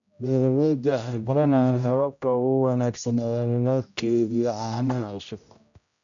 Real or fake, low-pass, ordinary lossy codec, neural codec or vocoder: fake; 7.2 kHz; none; codec, 16 kHz, 0.5 kbps, X-Codec, HuBERT features, trained on general audio